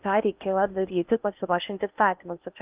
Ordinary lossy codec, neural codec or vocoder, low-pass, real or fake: Opus, 24 kbps; codec, 16 kHz in and 24 kHz out, 0.8 kbps, FocalCodec, streaming, 65536 codes; 3.6 kHz; fake